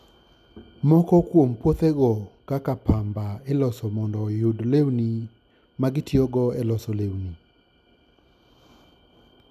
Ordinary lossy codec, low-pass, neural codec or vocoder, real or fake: none; 14.4 kHz; none; real